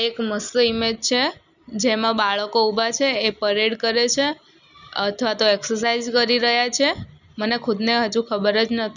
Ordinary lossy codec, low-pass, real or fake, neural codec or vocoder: none; 7.2 kHz; real; none